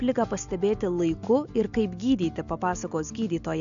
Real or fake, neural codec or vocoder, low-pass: real; none; 7.2 kHz